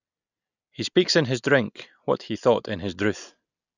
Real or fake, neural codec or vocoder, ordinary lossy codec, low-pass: real; none; none; 7.2 kHz